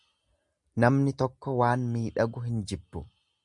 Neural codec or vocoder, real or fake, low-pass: none; real; 10.8 kHz